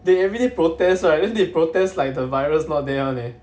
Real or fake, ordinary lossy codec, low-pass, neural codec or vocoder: real; none; none; none